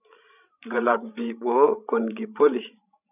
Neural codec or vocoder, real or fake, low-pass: codec, 16 kHz, 16 kbps, FreqCodec, larger model; fake; 3.6 kHz